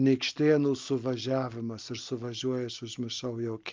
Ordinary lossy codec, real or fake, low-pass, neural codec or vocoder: Opus, 32 kbps; real; 7.2 kHz; none